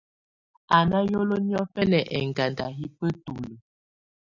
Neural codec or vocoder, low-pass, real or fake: none; 7.2 kHz; real